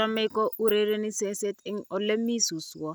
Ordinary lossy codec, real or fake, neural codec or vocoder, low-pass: none; real; none; none